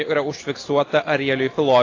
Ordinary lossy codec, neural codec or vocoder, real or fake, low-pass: AAC, 32 kbps; none; real; 7.2 kHz